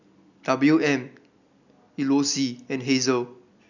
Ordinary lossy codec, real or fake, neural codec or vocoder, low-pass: none; real; none; 7.2 kHz